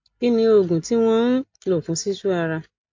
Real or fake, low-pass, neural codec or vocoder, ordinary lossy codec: real; 7.2 kHz; none; MP3, 48 kbps